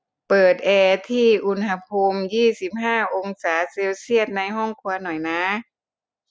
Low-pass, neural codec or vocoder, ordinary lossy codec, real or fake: none; none; none; real